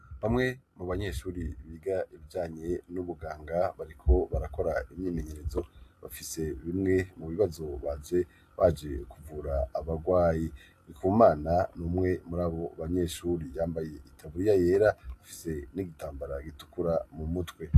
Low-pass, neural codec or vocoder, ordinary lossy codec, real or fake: 14.4 kHz; none; AAC, 64 kbps; real